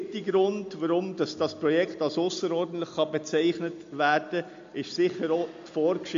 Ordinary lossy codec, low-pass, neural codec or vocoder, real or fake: MP3, 48 kbps; 7.2 kHz; none; real